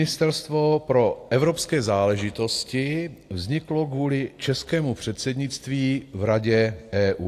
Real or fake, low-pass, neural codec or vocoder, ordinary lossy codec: real; 14.4 kHz; none; AAC, 64 kbps